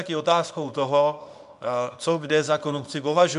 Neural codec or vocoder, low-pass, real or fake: codec, 24 kHz, 0.9 kbps, WavTokenizer, small release; 10.8 kHz; fake